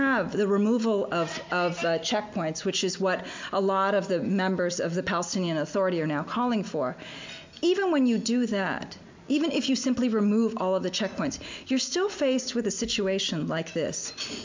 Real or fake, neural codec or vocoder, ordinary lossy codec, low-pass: real; none; MP3, 64 kbps; 7.2 kHz